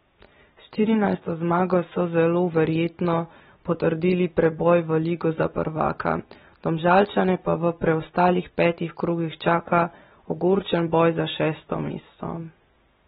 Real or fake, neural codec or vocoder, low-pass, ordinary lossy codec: real; none; 19.8 kHz; AAC, 16 kbps